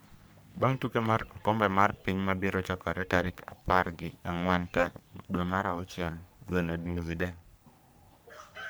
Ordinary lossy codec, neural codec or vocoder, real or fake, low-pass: none; codec, 44.1 kHz, 3.4 kbps, Pupu-Codec; fake; none